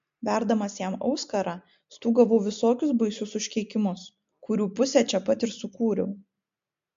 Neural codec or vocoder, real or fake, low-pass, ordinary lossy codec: none; real; 7.2 kHz; MP3, 48 kbps